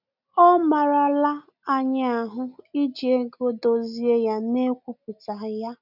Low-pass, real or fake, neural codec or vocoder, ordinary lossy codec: 5.4 kHz; real; none; none